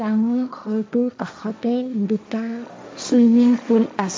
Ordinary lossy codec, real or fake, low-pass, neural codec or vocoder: none; fake; none; codec, 16 kHz, 1.1 kbps, Voila-Tokenizer